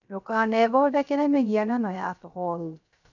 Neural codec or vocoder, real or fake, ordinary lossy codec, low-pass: codec, 16 kHz, 0.3 kbps, FocalCodec; fake; none; 7.2 kHz